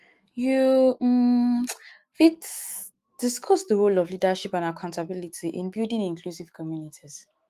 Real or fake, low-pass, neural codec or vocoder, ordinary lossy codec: fake; 14.4 kHz; autoencoder, 48 kHz, 128 numbers a frame, DAC-VAE, trained on Japanese speech; Opus, 24 kbps